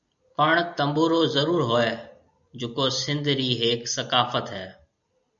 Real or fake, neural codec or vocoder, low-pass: real; none; 7.2 kHz